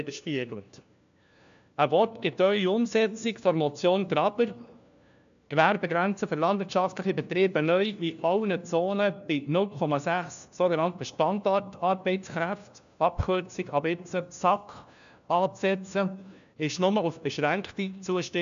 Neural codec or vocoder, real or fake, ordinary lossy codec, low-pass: codec, 16 kHz, 1 kbps, FunCodec, trained on LibriTTS, 50 frames a second; fake; AAC, 96 kbps; 7.2 kHz